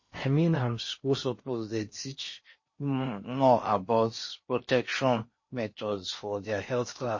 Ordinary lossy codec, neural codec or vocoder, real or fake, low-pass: MP3, 32 kbps; codec, 16 kHz in and 24 kHz out, 0.8 kbps, FocalCodec, streaming, 65536 codes; fake; 7.2 kHz